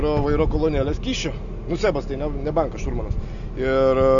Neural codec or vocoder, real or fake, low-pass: none; real; 7.2 kHz